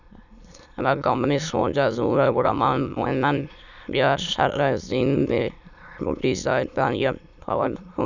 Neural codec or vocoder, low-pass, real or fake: autoencoder, 22.05 kHz, a latent of 192 numbers a frame, VITS, trained on many speakers; 7.2 kHz; fake